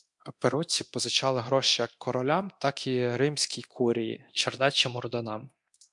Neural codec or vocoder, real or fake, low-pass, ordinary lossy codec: codec, 24 kHz, 0.9 kbps, DualCodec; fake; 10.8 kHz; MP3, 64 kbps